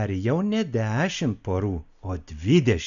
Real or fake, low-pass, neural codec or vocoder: real; 7.2 kHz; none